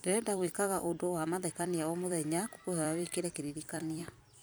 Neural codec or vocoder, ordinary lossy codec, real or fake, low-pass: vocoder, 44.1 kHz, 128 mel bands every 256 samples, BigVGAN v2; none; fake; none